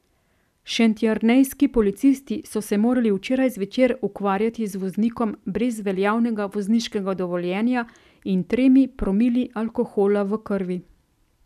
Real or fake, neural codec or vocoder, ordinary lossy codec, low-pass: real; none; none; 14.4 kHz